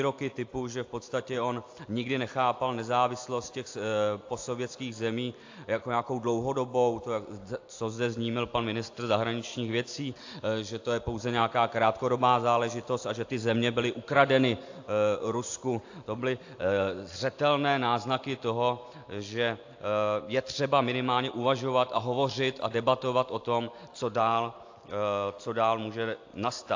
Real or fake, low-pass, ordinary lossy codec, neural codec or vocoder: real; 7.2 kHz; AAC, 48 kbps; none